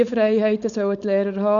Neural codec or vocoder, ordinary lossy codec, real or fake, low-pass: codec, 16 kHz, 4.8 kbps, FACodec; none; fake; 7.2 kHz